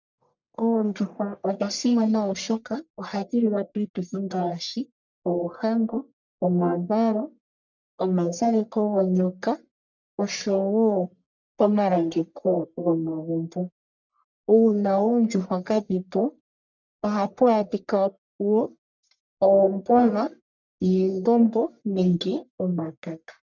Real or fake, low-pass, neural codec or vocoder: fake; 7.2 kHz; codec, 44.1 kHz, 1.7 kbps, Pupu-Codec